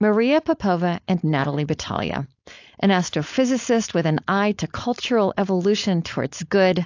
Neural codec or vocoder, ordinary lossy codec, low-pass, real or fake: codec, 16 kHz, 4.8 kbps, FACodec; AAC, 48 kbps; 7.2 kHz; fake